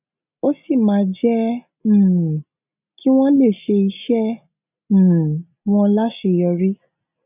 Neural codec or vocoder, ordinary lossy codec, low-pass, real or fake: none; none; 3.6 kHz; real